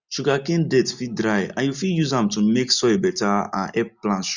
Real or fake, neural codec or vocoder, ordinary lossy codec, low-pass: real; none; none; 7.2 kHz